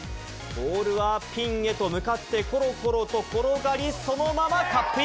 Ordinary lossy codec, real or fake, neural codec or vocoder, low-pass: none; real; none; none